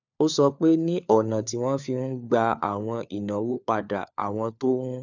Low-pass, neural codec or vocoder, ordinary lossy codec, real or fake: 7.2 kHz; codec, 16 kHz, 4 kbps, FunCodec, trained on LibriTTS, 50 frames a second; none; fake